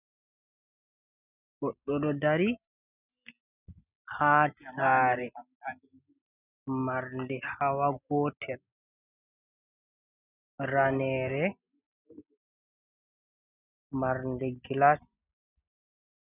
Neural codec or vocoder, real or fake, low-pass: none; real; 3.6 kHz